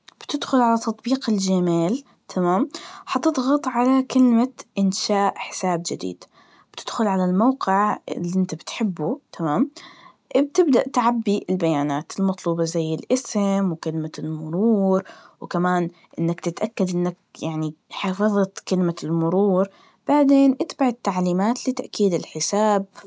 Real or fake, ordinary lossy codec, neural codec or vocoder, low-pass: real; none; none; none